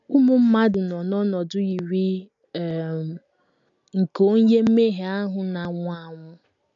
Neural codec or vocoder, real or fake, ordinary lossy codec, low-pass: none; real; none; 7.2 kHz